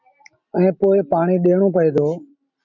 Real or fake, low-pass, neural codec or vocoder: real; 7.2 kHz; none